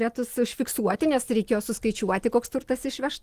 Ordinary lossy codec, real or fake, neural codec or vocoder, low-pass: Opus, 24 kbps; real; none; 14.4 kHz